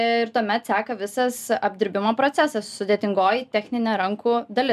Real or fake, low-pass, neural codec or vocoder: real; 14.4 kHz; none